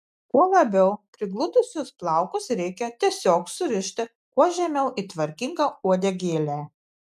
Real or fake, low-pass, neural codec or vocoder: real; 14.4 kHz; none